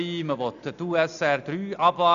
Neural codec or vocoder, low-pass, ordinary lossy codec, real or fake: none; 7.2 kHz; none; real